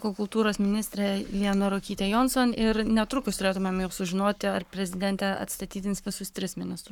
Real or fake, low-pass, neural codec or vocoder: fake; 19.8 kHz; codec, 44.1 kHz, 7.8 kbps, Pupu-Codec